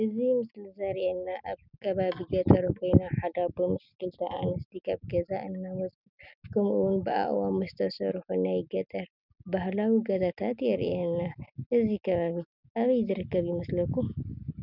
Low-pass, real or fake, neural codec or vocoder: 5.4 kHz; real; none